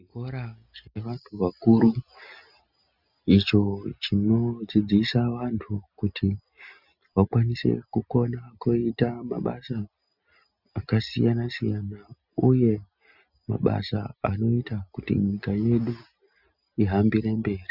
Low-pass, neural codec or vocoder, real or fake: 5.4 kHz; none; real